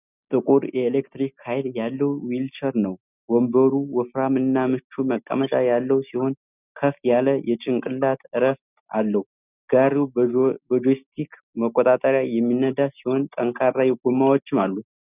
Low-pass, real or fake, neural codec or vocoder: 3.6 kHz; real; none